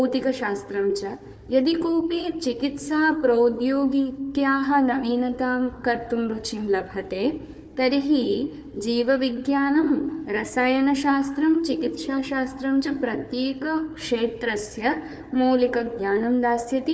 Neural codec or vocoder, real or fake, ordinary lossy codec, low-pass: codec, 16 kHz, 4 kbps, FunCodec, trained on Chinese and English, 50 frames a second; fake; none; none